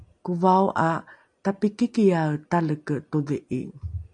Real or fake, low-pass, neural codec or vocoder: real; 9.9 kHz; none